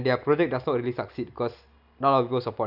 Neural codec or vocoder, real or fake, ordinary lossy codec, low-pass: none; real; none; 5.4 kHz